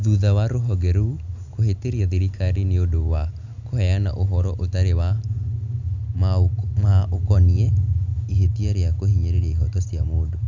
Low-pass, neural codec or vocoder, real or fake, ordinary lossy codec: 7.2 kHz; none; real; none